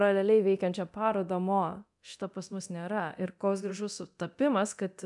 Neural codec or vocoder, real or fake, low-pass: codec, 24 kHz, 0.9 kbps, DualCodec; fake; 10.8 kHz